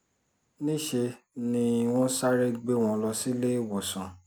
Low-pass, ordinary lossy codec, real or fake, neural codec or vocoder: none; none; real; none